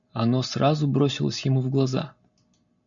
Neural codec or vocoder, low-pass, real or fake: none; 7.2 kHz; real